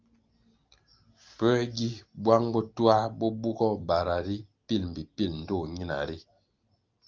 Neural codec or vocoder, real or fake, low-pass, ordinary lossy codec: none; real; 7.2 kHz; Opus, 24 kbps